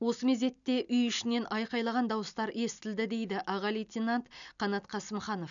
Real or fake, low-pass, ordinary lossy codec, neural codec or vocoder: real; 7.2 kHz; none; none